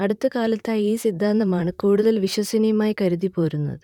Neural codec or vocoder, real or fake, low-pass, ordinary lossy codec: vocoder, 44.1 kHz, 128 mel bands, Pupu-Vocoder; fake; 19.8 kHz; none